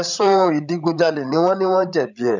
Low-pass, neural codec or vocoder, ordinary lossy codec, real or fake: 7.2 kHz; codec, 16 kHz, 16 kbps, FreqCodec, larger model; none; fake